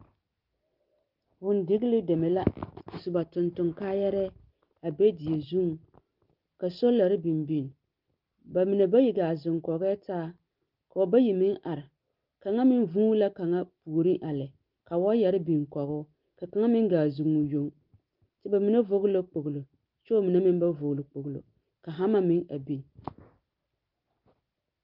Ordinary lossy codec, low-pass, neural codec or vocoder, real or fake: Opus, 32 kbps; 5.4 kHz; none; real